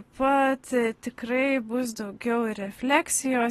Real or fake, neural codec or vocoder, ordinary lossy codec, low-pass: real; none; AAC, 32 kbps; 19.8 kHz